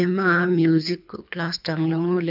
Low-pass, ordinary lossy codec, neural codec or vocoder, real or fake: 5.4 kHz; none; codec, 24 kHz, 3 kbps, HILCodec; fake